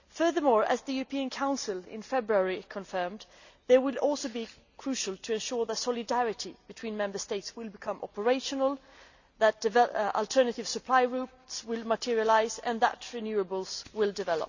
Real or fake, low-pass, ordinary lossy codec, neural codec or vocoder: real; 7.2 kHz; none; none